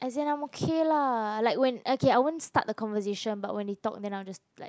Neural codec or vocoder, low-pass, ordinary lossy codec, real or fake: none; none; none; real